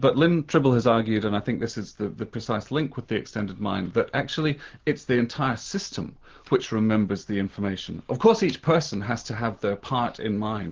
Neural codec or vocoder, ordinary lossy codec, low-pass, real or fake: none; Opus, 16 kbps; 7.2 kHz; real